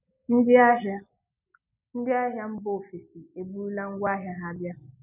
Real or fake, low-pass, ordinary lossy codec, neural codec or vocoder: real; 3.6 kHz; none; none